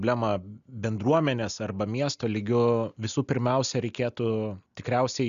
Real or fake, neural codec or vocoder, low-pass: real; none; 7.2 kHz